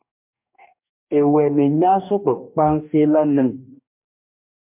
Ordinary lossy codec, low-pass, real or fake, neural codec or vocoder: MP3, 32 kbps; 3.6 kHz; fake; codec, 44.1 kHz, 2.6 kbps, DAC